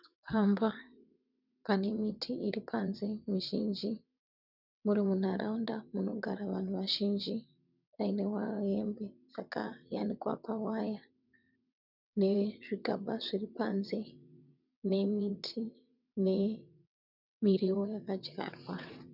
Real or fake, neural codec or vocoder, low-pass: fake; vocoder, 22.05 kHz, 80 mel bands, WaveNeXt; 5.4 kHz